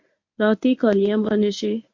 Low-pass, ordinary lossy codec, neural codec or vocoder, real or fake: 7.2 kHz; MP3, 48 kbps; codec, 24 kHz, 0.9 kbps, WavTokenizer, medium speech release version 1; fake